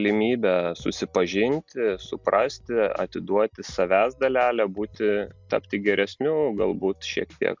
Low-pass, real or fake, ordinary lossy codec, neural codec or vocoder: 7.2 kHz; real; MP3, 64 kbps; none